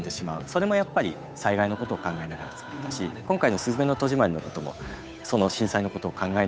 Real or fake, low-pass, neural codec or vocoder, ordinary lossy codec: fake; none; codec, 16 kHz, 8 kbps, FunCodec, trained on Chinese and English, 25 frames a second; none